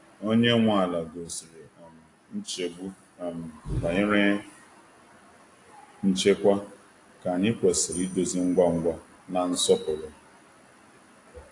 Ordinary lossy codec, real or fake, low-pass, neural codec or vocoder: AAC, 48 kbps; real; 10.8 kHz; none